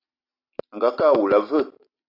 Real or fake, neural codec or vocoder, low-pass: real; none; 5.4 kHz